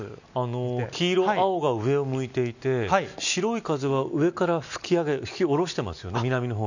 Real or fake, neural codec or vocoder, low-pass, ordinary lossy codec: real; none; 7.2 kHz; none